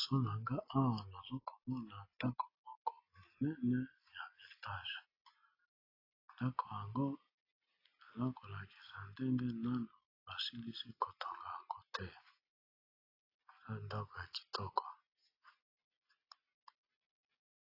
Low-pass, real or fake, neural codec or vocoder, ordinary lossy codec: 5.4 kHz; real; none; AAC, 24 kbps